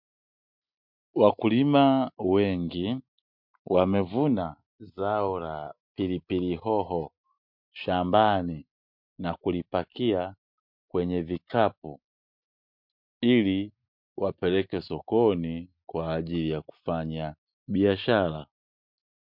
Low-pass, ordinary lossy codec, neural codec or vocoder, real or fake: 5.4 kHz; MP3, 48 kbps; none; real